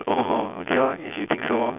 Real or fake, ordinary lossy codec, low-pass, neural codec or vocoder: fake; none; 3.6 kHz; vocoder, 22.05 kHz, 80 mel bands, Vocos